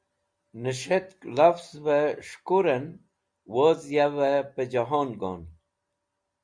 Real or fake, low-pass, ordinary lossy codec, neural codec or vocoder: real; 9.9 kHz; Opus, 64 kbps; none